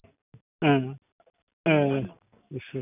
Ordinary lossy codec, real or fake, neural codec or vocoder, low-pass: none; real; none; 3.6 kHz